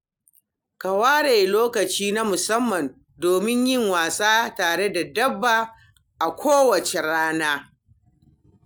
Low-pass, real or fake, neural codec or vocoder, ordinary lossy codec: none; real; none; none